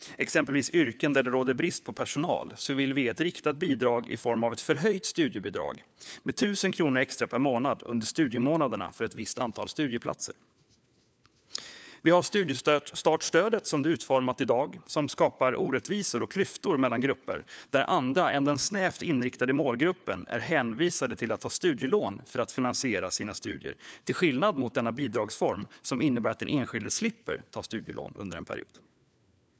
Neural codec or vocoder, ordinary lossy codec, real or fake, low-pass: codec, 16 kHz, 4 kbps, FunCodec, trained on LibriTTS, 50 frames a second; none; fake; none